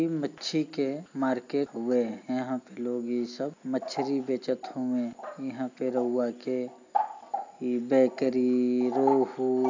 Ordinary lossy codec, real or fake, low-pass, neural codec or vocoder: AAC, 48 kbps; real; 7.2 kHz; none